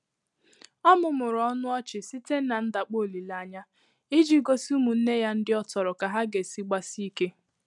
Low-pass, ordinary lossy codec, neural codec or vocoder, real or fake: 10.8 kHz; MP3, 96 kbps; none; real